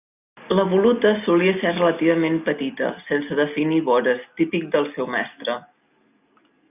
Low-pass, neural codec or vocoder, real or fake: 3.6 kHz; none; real